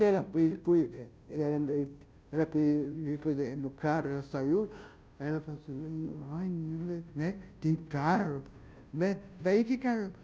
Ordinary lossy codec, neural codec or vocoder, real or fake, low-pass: none; codec, 16 kHz, 0.5 kbps, FunCodec, trained on Chinese and English, 25 frames a second; fake; none